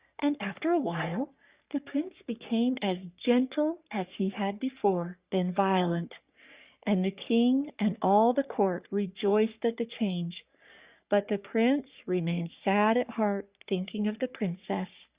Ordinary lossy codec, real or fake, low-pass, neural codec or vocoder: Opus, 64 kbps; fake; 3.6 kHz; codec, 44.1 kHz, 3.4 kbps, Pupu-Codec